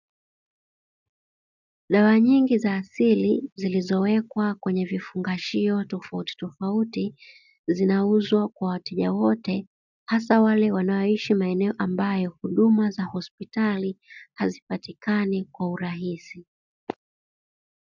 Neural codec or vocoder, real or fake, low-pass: none; real; 7.2 kHz